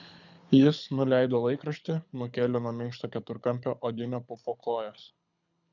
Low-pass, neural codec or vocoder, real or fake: 7.2 kHz; codec, 24 kHz, 6 kbps, HILCodec; fake